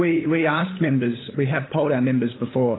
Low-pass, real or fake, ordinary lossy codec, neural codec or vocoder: 7.2 kHz; fake; AAC, 16 kbps; codec, 16 kHz, 8 kbps, FunCodec, trained on LibriTTS, 25 frames a second